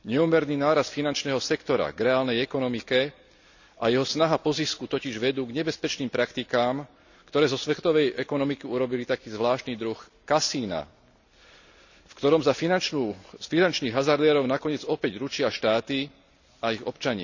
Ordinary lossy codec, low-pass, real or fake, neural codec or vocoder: none; 7.2 kHz; real; none